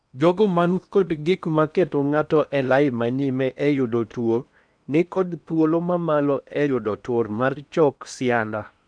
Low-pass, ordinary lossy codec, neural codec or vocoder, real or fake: 9.9 kHz; none; codec, 16 kHz in and 24 kHz out, 0.8 kbps, FocalCodec, streaming, 65536 codes; fake